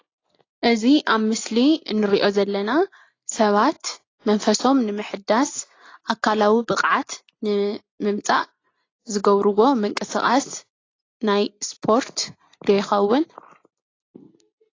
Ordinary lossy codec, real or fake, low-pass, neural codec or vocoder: AAC, 32 kbps; real; 7.2 kHz; none